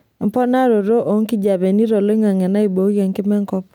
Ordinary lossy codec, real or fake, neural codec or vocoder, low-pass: none; real; none; 19.8 kHz